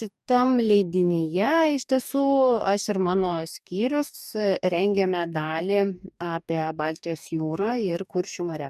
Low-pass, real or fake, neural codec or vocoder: 14.4 kHz; fake; codec, 44.1 kHz, 2.6 kbps, DAC